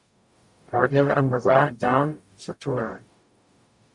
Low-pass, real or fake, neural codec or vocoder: 10.8 kHz; fake; codec, 44.1 kHz, 0.9 kbps, DAC